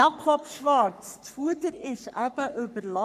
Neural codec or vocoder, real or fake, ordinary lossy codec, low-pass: codec, 44.1 kHz, 3.4 kbps, Pupu-Codec; fake; none; 14.4 kHz